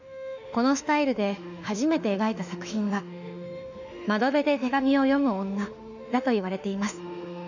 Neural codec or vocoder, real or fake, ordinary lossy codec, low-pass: autoencoder, 48 kHz, 32 numbers a frame, DAC-VAE, trained on Japanese speech; fake; none; 7.2 kHz